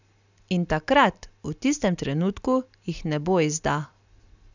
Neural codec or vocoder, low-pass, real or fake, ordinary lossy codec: none; 7.2 kHz; real; none